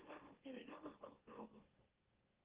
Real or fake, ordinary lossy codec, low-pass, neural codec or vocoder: fake; Opus, 16 kbps; 3.6 kHz; autoencoder, 44.1 kHz, a latent of 192 numbers a frame, MeloTTS